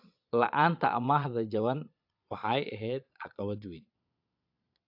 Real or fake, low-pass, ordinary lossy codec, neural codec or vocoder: fake; 5.4 kHz; AAC, 48 kbps; vocoder, 22.05 kHz, 80 mel bands, Vocos